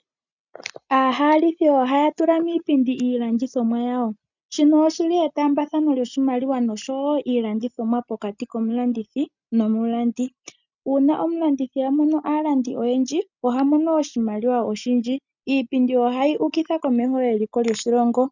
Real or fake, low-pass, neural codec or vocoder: real; 7.2 kHz; none